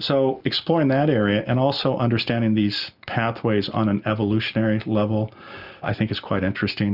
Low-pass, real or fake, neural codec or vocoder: 5.4 kHz; real; none